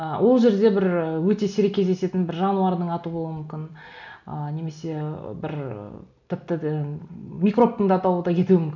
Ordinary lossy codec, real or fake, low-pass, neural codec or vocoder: none; real; 7.2 kHz; none